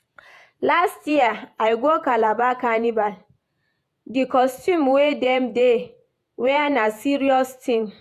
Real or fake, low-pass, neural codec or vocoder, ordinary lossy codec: fake; 14.4 kHz; vocoder, 48 kHz, 128 mel bands, Vocos; AAC, 96 kbps